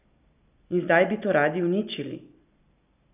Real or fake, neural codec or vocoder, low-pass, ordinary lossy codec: real; none; 3.6 kHz; none